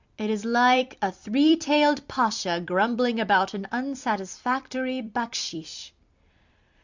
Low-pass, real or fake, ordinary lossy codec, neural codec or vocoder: 7.2 kHz; fake; Opus, 64 kbps; vocoder, 44.1 kHz, 128 mel bands every 256 samples, BigVGAN v2